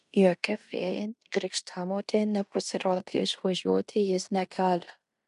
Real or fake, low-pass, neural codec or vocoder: fake; 10.8 kHz; codec, 16 kHz in and 24 kHz out, 0.9 kbps, LongCat-Audio-Codec, fine tuned four codebook decoder